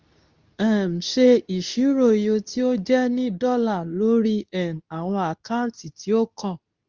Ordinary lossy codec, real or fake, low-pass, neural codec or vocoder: Opus, 32 kbps; fake; 7.2 kHz; codec, 24 kHz, 0.9 kbps, WavTokenizer, medium speech release version 2